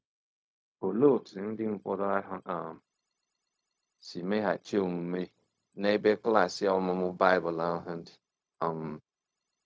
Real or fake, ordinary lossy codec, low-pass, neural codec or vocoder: fake; none; none; codec, 16 kHz, 0.4 kbps, LongCat-Audio-Codec